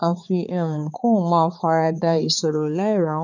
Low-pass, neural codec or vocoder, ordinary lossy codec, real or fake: 7.2 kHz; codec, 16 kHz, 4 kbps, X-Codec, HuBERT features, trained on balanced general audio; none; fake